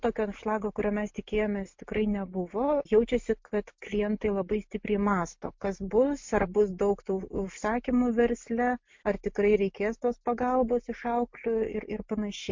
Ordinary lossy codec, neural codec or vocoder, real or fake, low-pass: MP3, 48 kbps; none; real; 7.2 kHz